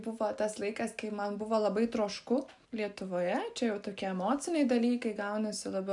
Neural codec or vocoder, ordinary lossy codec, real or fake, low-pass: none; MP3, 96 kbps; real; 10.8 kHz